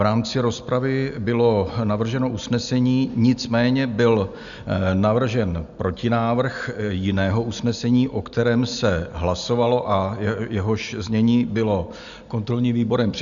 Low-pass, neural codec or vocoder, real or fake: 7.2 kHz; none; real